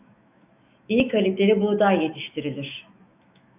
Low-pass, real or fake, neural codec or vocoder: 3.6 kHz; fake; autoencoder, 48 kHz, 128 numbers a frame, DAC-VAE, trained on Japanese speech